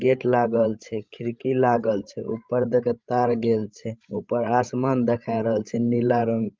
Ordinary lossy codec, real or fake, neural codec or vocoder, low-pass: Opus, 24 kbps; fake; codec, 16 kHz, 16 kbps, FreqCodec, larger model; 7.2 kHz